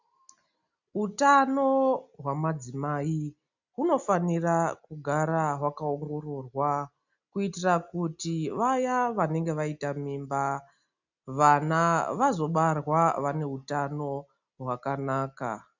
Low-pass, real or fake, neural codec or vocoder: 7.2 kHz; real; none